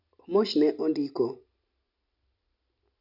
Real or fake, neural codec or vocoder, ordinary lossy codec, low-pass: real; none; none; 5.4 kHz